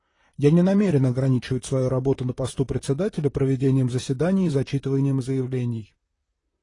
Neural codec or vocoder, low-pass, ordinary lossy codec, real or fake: vocoder, 44.1 kHz, 128 mel bands, Pupu-Vocoder; 10.8 kHz; AAC, 32 kbps; fake